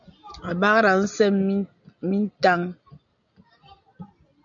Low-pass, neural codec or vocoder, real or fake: 7.2 kHz; none; real